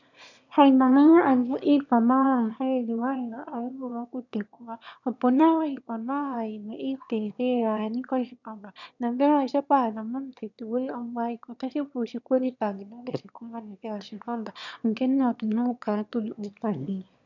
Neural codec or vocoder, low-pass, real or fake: autoencoder, 22.05 kHz, a latent of 192 numbers a frame, VITS, trained on one speaker; 7.2 kHz; fake